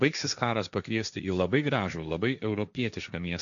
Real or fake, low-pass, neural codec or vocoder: fake; 7.2 kHz; codec, 16 kHz, 1.1 kbps, Voila-Tokenizer